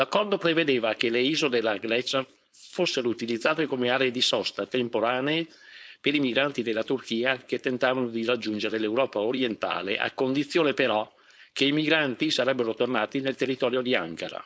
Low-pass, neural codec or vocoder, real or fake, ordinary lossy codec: none; codec, 16 kHz, 4.8 kbps, FACodec; fake; none